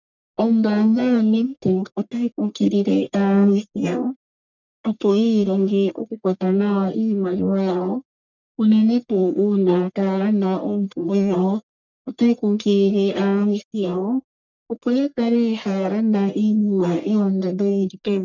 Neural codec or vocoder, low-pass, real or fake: codec, 44.1 kHz, 1.7 kbps, Pupu-Codec; 7.2 kHz; fake